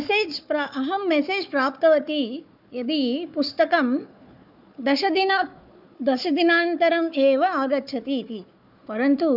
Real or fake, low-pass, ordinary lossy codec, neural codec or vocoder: fake; 5.4 kHz; none; codec, 16 kHz, 4 kbps, FunCodec, trained on Chinese and English, 50 frames a second